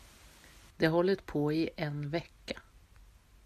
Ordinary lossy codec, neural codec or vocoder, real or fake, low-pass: MP3, 96 kbps; none; real; 14.4 kHz